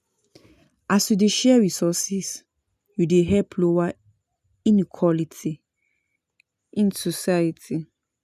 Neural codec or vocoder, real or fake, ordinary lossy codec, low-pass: none; real; none; 14.4 kHz